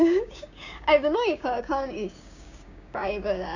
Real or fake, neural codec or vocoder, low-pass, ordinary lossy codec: fake; codec, 16 kHz, 6 kbps, DAC; 7.2 kHz; none